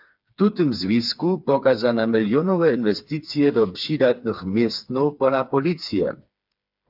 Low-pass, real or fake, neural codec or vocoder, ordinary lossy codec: 5.4 kHz; fake; codec, 16 kHz, 4 kbps, FreqCodec, smaller model; AAC, 48 kbps